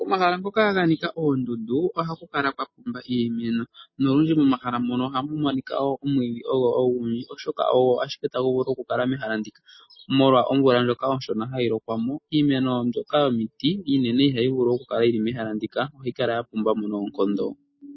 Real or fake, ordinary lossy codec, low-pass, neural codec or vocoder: real; MP3, 24 kbps; 7.2 kHz; none